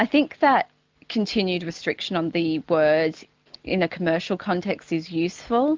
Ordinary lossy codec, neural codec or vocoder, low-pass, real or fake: Opus, 32 kbps; none; 7.2 kHz; real